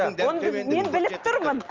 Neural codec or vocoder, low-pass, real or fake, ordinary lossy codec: none; 7.2 kHz; real; Opus, 24 kbps